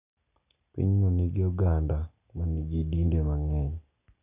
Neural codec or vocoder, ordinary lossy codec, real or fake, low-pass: none; none; real; 3.6 kHz